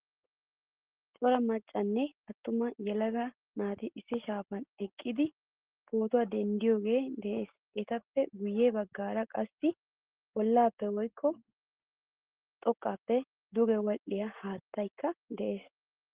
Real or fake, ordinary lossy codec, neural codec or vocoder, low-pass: real; Opus, 16 kbps; none; 3.6 kHz